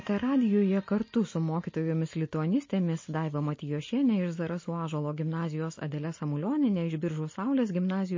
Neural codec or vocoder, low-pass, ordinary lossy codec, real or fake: none; 7.2 kHz; MP3, 32 kbps; real